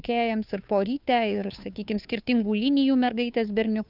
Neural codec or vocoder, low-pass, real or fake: codec, 16 kHz, 2 kbps, FunCodec, trained on Chinese and English, 25 frames a second; 5.4 kHz; fake